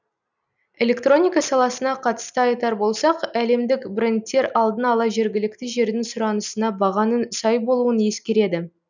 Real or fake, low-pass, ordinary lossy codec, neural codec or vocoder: real; 7.2 kHz; none; none